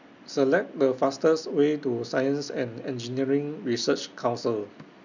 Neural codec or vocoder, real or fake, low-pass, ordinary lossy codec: none; real; 7.2 kHz; none